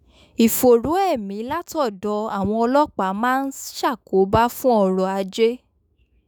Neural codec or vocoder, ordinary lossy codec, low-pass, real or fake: autoencoder, 48 kHz, 128 numbers a frame, DAC-VAE, trained on Japanese speech; none; none; fake